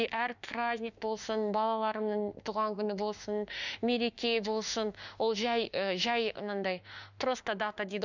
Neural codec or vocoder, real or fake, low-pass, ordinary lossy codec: autoencoder, 48 kHz, 32 numbers a frame, DAC-VAE, trained on Japanese speech; fake; 7.2 kHz; none